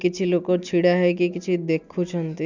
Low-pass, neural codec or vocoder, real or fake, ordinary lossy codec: 7.2 kHz; none; real; none